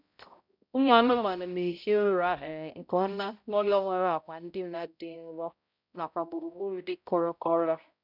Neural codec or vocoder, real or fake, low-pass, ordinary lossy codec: codec, 16 kHz, 0.5 kbps, X-Codec, HuBERT features, trained on balanced general audio; fake; 5.4 kHz; none